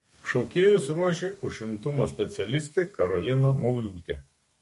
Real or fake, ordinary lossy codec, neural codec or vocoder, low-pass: fake; MP3, 48 kbps; codec, 32 kHz, 1.9 kbps, SNAC; 14.4 kHz